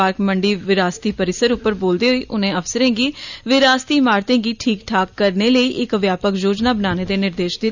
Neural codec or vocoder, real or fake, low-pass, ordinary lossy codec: none; real; 7.2 kHz; none